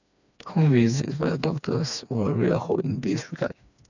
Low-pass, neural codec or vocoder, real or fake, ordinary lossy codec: 7.2 kHz; codec, 16 kHz, 2 kbps, FreqCodec, smaller model; fake; none